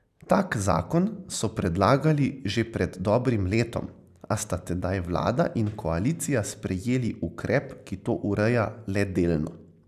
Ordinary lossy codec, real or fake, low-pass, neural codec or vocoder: none; real; 14.4 kHz; none